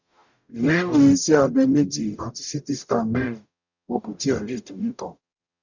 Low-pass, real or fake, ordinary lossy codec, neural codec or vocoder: 7.2 kHz; fake; none; codec, 44.1 kHz, 0.9 kbps, DAC